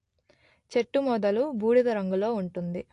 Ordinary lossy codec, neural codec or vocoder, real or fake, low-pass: AAC, 48 kbps; none; real; 10.8 kHz